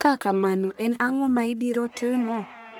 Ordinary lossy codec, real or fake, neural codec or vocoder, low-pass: none; fake; codec, 44.1 kHz, 1.7 kbps, Pupu-Codec; none